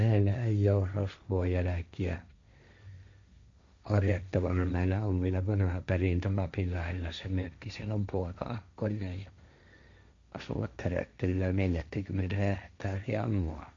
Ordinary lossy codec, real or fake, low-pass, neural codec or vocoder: MP3, 48 kbps; fake; 7.2 kHz; codec, 16 kHz, 1.1 kbps, Voila-Tokenizer